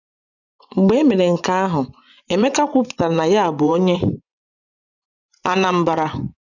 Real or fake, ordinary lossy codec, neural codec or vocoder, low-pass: fake; none; vocoder, 24 kHz, 100 mel bands, Vocos; 7.2 kHz